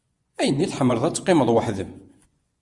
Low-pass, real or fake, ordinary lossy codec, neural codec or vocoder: 10.8 kHz; fake; Opus, 64 kbps; vocoder, 24 kHz, 100 mel bands, Vocos